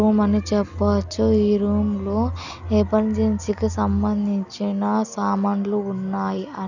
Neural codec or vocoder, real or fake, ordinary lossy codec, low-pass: none; real; none; 7.2 kHz